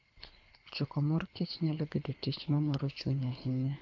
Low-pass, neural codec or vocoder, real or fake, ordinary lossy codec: 7.2 kHz; codec, 24 kHz, 3 kbps, HILCodec; fake; AAC, 48 kbps